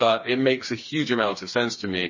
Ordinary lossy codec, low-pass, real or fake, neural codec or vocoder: MP3, 32 kbps; 7.2 kHz; fake; codec, 16 kHz, 4 kbps, FreqCodec, smaller model